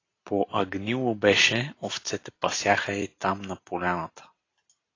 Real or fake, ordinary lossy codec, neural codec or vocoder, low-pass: real; AAC, 32 kbps; none; 7.2 kHz